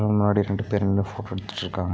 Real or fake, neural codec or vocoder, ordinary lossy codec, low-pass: real; none; none; none